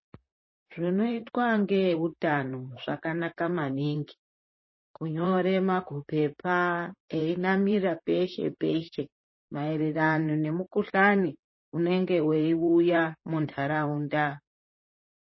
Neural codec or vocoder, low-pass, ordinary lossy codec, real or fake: vocoder, 44.1 kHz, 128 mel bands, Pupu-Vocoder; 7.2 kHz; MP3, 24 kbps; fake